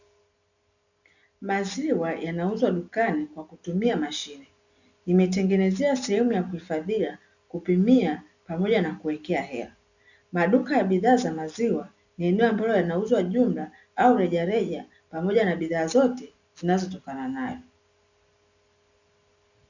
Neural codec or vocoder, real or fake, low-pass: none; real; 7.2 kHz